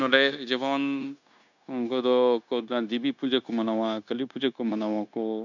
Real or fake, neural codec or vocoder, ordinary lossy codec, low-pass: fake; codec, 16 kHz, 0.9 kbps, LongCat-Audio-Codec; none; 7.2 kHz